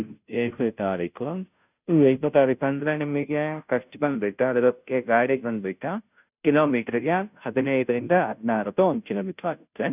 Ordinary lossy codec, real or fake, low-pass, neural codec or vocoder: none; fake; 3.6 kHz; codec, 16 kHz, 0.5 kbps, FunCodec, trained on Chinese and English, 25 frames a second